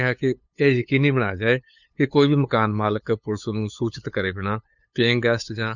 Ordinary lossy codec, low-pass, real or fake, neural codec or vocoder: none; 7.2 kHz; fake; codec, 16 kHz, 8 kbps, FunCodec, trained on LibriTTS, 25 frames a second